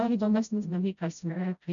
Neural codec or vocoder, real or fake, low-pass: codec, 16 kHz, 0.5 kbps, FreqCodec, smaller model; fake; 7.2 kHz